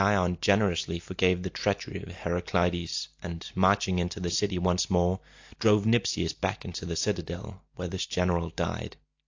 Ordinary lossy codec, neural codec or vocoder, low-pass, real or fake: AAC, 48 kbps; none; 7.2 kHz; real